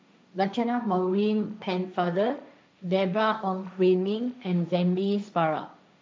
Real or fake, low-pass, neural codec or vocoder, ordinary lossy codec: fake; none; codec, 16 kHz, 1.1 kbps, Voila-Tokenizer; none